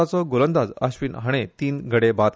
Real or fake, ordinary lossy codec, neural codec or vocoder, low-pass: real; none; none; none